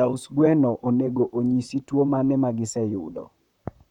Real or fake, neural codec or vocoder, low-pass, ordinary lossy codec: fake; vocoder, 44.1 kHz, 128 mel bands, Pupu-Vocoder; 19.8 kHz; none